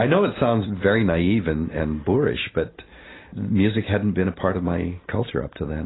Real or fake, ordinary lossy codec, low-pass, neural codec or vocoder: real; AAC, 16 kbps; 7.2 kHz; none